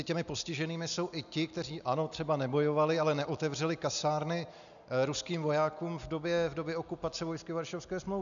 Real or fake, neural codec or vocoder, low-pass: real; none; 7.2 kHz